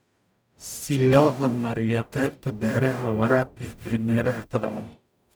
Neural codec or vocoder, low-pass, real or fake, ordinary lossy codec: codec, 44.1 kHz, 0.9 kbps, DAC; none; fake; none